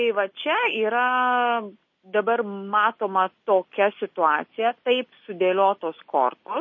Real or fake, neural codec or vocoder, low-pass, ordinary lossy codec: real; none; 7.2 kHz; MP3, 32 kbps